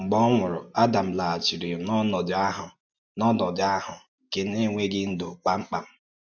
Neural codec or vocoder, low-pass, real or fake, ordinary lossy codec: none; 7.2 kHz; real; none